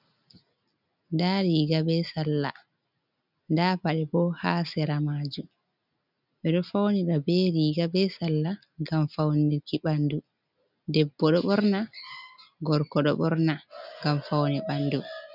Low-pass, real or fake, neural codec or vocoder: 5.4 kHz; real; none